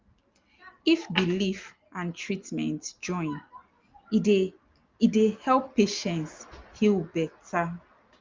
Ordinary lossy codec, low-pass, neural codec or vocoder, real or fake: Opus, 24 kbps; 7.2 kHz; none; real